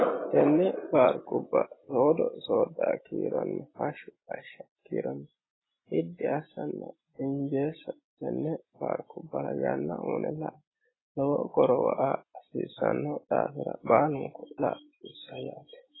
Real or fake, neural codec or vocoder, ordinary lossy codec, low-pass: real; none; AAC, 16 kbps; 7.2 kHz